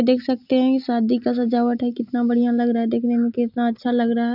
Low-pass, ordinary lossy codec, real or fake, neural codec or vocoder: 5.4 kHz; none; real; none